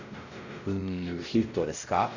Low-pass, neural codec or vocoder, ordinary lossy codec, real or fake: 7.2 kHz; codec, 16 kHz, 0.5 kbps, X-Codec, WavLM features, trained on Multilingual LibriSpeech; none; fake